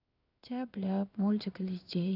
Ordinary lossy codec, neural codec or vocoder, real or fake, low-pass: none; codec, 16 kHz in and 24 kHz out, 1 kbps, XY-Tokenizer; fake; 5.4 kHz